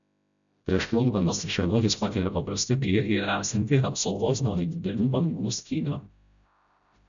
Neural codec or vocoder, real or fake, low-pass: codec, 16 kHz, 0.5 kbps, FreqCodec, smaller model; fake; 7.2 kHz